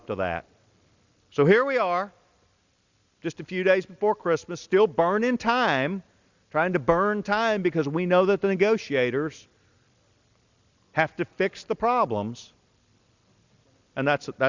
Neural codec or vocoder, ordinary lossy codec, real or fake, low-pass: none; Opus, 64 kbps; real; 7.2 kHz